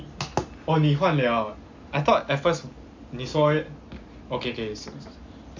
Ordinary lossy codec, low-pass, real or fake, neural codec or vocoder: MP3, 64 kbps; 7.2 kHz; real; none